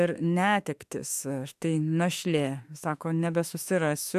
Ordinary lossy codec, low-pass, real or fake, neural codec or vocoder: AAC, 96 kbps; 14.4 kHz; fake; autoencoder, 48 kHz, 32 numbers a frame, DAC-VAE, trained on Japanese speech